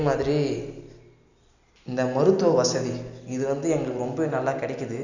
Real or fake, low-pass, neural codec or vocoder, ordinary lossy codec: real; 7.2 kHz; none; none